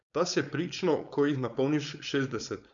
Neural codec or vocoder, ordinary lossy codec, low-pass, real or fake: codec, 16 kHz, 4.8 kbps, FACodec; none; 7.2 kHz; fake